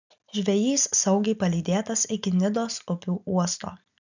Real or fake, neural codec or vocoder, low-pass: real; none; 7.2 kHz